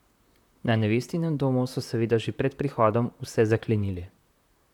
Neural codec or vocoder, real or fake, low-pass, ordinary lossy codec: vocoder, 44.1 kHz, 128 mel bands, Pupu-Vocoder; fake; 19.8 kHz; none